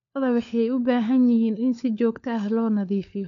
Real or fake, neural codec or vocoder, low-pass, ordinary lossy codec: fake; codec, 16 kHz, 4 kbps, FunCodec, trained on LibriTTS, 50 frames a second; 7.2 kHz; none